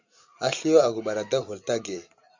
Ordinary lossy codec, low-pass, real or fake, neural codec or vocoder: Opus, 64 kbps; 7.2 kHz; fake; vocoder, 44.1 kHz, 128 mel bands every 256 samples, BigVGAN v2